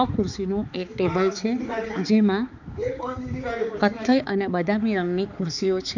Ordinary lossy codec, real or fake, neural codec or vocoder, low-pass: none; fake; codec, 16 kHz, 4 kbps, X-Codec, HuBERT features, trained on balanced general audio; 7.2 kHz